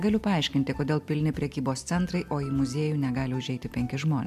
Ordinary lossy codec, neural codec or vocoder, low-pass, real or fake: MP3, 96 kbps; none; 14.4 kHz; real